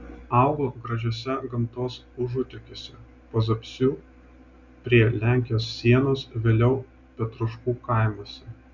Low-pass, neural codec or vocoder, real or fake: 7.2 kHz; none; real